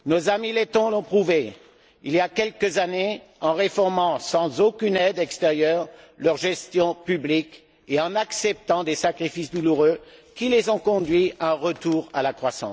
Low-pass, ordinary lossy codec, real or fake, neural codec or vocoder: none; none; real; none